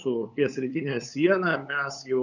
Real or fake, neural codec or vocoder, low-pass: fake; codec, 16 kHz, 8 kbps, FunCodec, trained on LibriTTS, 25 frames a second; 7.2 kHz